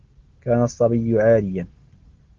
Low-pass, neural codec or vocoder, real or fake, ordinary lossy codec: 7.2 kHz; none; real; Opus, 16 kbps